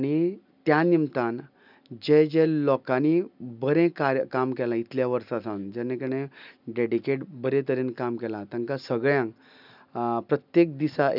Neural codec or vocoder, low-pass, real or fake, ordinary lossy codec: none; 5.4 kHz; real; none